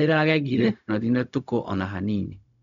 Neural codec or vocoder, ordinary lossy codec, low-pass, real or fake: codec, 16 kHz, 0.4 kbps, LongCat-Audio-Codec; none; 7.2 kHz; fake